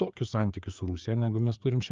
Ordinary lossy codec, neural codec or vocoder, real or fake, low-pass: Opus, 32 kbps; codec, 16 kHz, 4 kbps, FreqCodec, larger model; fake; 7.2 kHz